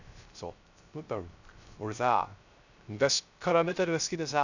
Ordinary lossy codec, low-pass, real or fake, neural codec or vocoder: none; 7.2 kHz; fake; codec, 16 kHz, 0.3 kbps, FocalCodec